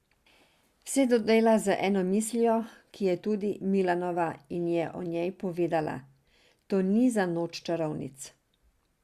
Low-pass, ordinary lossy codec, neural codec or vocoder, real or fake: 14.4 kHz; Opus, 64 kbps; none; real